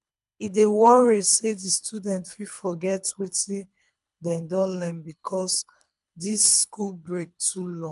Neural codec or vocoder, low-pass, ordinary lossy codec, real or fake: codec, 24 kHz, 3 kbps, HILCodec; 10.8 kHz; none; fake